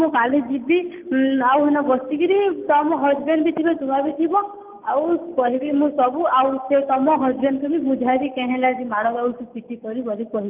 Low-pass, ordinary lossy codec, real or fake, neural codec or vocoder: 3.6 kHz; Opus, 24 kbps; real; none